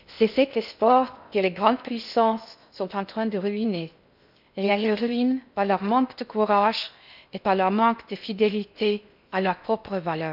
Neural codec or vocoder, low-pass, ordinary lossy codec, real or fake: codec, 16 kHz in and 24 kHz out, 0.6 kbps, FocalCodec, streaming, 4096 codes; 5.4 kHz; none; fake